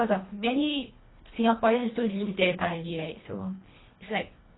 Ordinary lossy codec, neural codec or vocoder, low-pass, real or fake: AAC, 16 kbps; codec, 24 kHz, 1.5 kbps, HILCodec; 7.2 kHz; fake